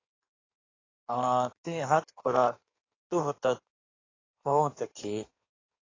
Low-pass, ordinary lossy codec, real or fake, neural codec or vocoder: 7.2 kHz; AAC, 32 kbps; fake; codec, 16 kHz in and 24 kHz out, 1.1 kbps, FireRedTTS-2 codec